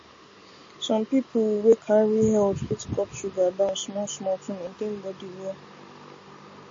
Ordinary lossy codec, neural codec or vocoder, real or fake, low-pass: MP3, 32 kbps; none; real; 7.2 kHz